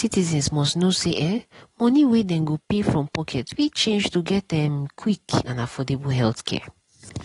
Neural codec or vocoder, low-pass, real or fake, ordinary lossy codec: none; 10.8 kHz; real; AAC, 32 kbps